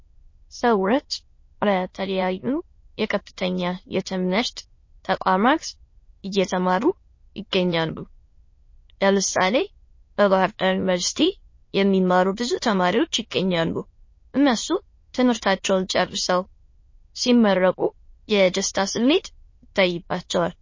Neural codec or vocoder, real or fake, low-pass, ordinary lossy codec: autoencoder, 22.05 kHz, a latent of 192 numbers a frame, VITS, trained on many speakers; fake; 7.2 kHz; MP3, 32 kbps